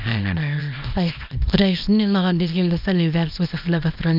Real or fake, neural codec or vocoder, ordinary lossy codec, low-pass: fake; codec, 24 kHz, 0.9 kbps, WavTokenizer, small release; none; 5.4 kHz